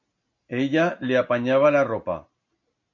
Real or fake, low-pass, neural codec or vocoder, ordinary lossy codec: real; 7.2 kHz; none; MP3, 64 kbps